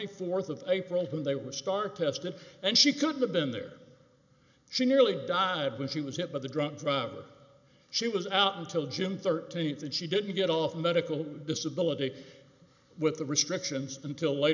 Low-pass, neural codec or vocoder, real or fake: 7.2 kHz; none; real